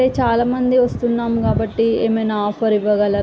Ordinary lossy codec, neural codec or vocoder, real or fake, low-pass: none; none; real; none